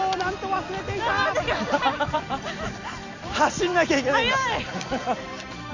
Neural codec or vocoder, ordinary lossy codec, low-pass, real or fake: none; Opus, 64 kbps; 7.2 kHz; real